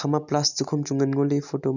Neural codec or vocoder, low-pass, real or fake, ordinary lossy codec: none; 7.2 kHz; real; none